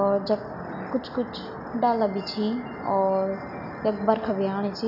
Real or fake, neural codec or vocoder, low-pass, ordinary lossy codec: real; none; 5.4 kHz; none